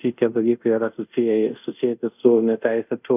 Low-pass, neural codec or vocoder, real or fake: 3.6 kHz; codec, 24 kHz, 0.5 kbps, DualCodec; fake